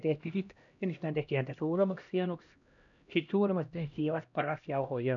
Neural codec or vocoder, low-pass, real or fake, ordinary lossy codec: codec, 16 kHz, 1 kbps, X-Codec, HuBERT features, trained on LibriSpeech; 7.2 kHz; fake; none